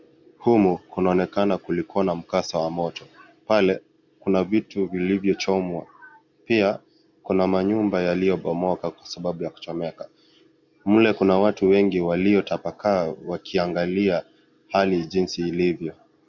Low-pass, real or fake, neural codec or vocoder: 7.2 kHz; real; none